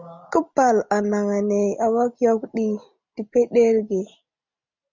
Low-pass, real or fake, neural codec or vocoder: 7.2 kHz; real; none